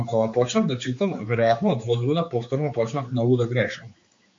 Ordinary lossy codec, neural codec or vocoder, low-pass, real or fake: MP3, 48 kbps; codec, 16 kHz, 4 kbps, X-Codec, HuBERT features, trained on general audio; 7.2 kHz; fake